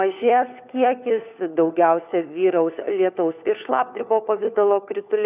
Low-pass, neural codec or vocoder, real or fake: 3.6 kHz; codec, 16 kHz, 4 kbps, FunCodec, trained on LibriTTS, 50 frames a second; fake